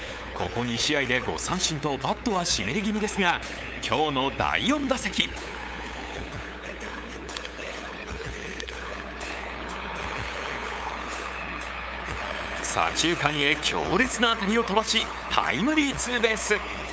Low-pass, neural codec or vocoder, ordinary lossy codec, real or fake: none; codec, 16 kHz, 8 kbps, FunCodec, trained on LibriTTS, 25 frames a second; none; fake